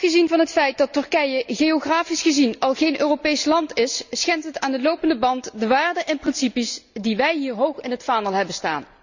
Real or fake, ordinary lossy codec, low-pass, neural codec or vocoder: real; none; 7.2 kHz; none